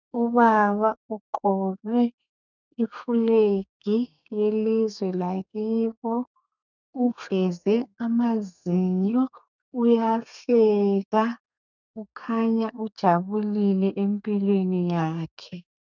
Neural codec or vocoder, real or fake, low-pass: codec, 32 kHz, 1.9 kbps, SNAC; fake; 7.2 kHz